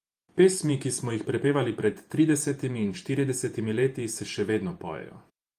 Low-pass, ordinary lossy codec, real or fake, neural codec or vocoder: 14.4 kHz; Opus, 32 kbps; fake; vocoder, 48 kHz, 128 mel bands, Vocos